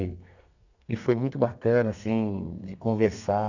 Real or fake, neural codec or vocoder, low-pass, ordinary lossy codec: fake; codec, 32 kHz, 1.9 kbps, SNAC; 7.2 kHz; none